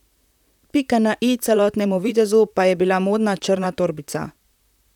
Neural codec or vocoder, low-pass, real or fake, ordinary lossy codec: vocoder, 44.1 kHz, 128 mel bands, Pupu-Vocoder; 19.8 kHz; fake; none